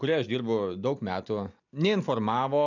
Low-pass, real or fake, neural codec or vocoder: 7.2 kHz; real; none